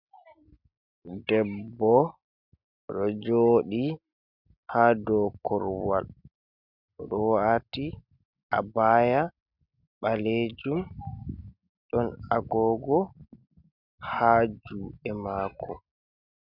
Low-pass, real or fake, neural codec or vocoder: 5.4 kHz; real; none